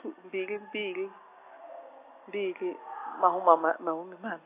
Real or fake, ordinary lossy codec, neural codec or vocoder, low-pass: real; AAC, 24 kbps; none; 3.6 kHz